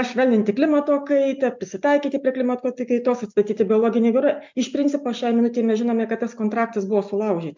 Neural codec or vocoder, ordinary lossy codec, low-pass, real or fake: none; MP3, 64 kbps; 7.2 kHz; real